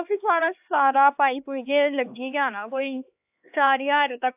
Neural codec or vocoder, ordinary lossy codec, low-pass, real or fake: codec, 16 kHz, 2 kbps, X-Codec, HuBERT features, trained on LibriSpeech; AAC, 32 kbps; 3.6 kHz; fake